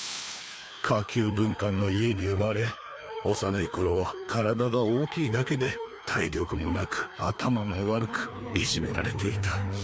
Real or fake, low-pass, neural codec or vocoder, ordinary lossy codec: fake; none; codec, 16 kHz, 2 kbps, FreqCodec, larger model; none